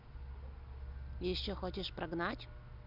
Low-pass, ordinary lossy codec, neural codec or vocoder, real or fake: 5.4 kHz; none; none; real